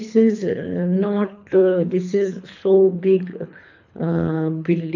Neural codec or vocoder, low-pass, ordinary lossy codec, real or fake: codec, 24 kHz, 3 kbps, HILCodec; 7.2 kHz; none; fake